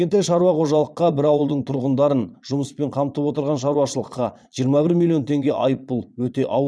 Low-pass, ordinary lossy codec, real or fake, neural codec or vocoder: none; none; fake; vocoder, 22.05 kHz, 80 mel bands, Vocos